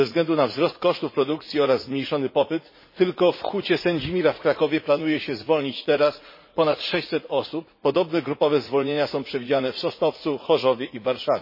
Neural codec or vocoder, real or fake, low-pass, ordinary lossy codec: vocoder, 44.1 kHz, 80 mel bands, Vocos; fake; 5.4 kHz; MP3, 24 kbps